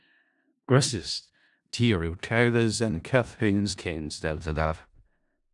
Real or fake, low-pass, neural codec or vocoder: fake; 10.8 kHz; codec, 16 kHz in and 24 kHz out, 0.4 kbps, LongCat-Audio-Codec, four codebook decoder